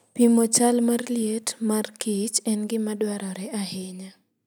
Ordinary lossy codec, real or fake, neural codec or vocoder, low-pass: none; real; none; none